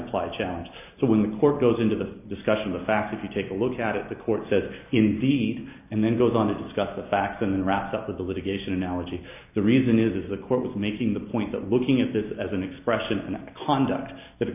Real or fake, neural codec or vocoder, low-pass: real; none; 3.6 kHz